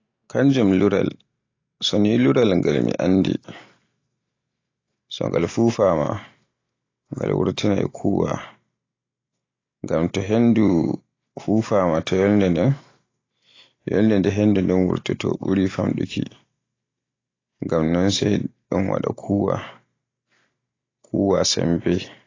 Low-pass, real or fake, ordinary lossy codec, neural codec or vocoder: 7.2 kHz; real; AAC, 32 kbps; none